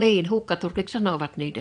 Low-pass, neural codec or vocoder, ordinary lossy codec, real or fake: 9.9 kHz; vocoder, 22.05 kHz, 80 mel bands, Vocos; MP3, 96 kbps; fake